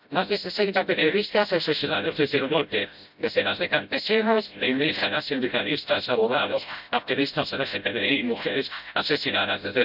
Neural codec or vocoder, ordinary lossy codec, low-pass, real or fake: codec, 16 kHz, 0.5 kbps, FreqCodec, smaller model; none; 5.4 kHz; fake